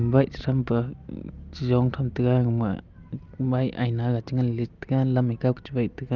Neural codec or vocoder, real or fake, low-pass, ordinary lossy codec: none; real; 7.2 kHz; Opus, 32 kbps